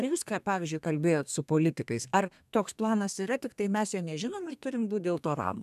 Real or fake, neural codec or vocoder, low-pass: fake; codec, 32 kHz, 1.9 kbps, SNAC; 14.4 kHz